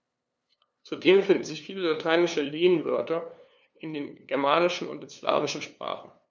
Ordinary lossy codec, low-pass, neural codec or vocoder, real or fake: none; none; codec, 16 kHz, 2 kbps, FunCodec, trained on LibriTTS, 25 frames a second; fake